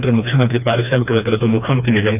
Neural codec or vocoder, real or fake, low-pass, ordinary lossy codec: codec, 16 kHz, 2 kbps, FreqCodec, smaller model; fake; 3.6 kHz; none